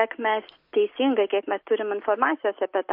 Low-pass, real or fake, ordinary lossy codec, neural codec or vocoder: 5.4 kHz; real; MP3, 32 kbps; none